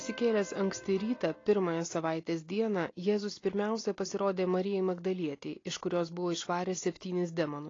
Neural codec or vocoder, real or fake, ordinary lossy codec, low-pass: none; real; AAC, 32 kbps; 7.2 kHz